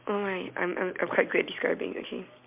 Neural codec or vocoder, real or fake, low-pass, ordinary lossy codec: none; real; 3.6 kHz; MP3, 32 kbps